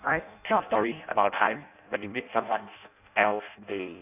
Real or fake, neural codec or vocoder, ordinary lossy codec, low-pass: fake; codec, 16 kHz in and 24 kHz out, 0.6 kbps, FireRedTTS-2 codec; none; 3.6 kHz